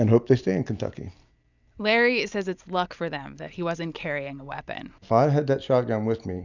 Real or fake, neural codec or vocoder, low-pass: real; none; 7.2 kHz